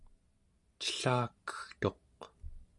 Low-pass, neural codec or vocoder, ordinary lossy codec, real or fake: 10.8 kHz; none; AAC, 64 kbps; real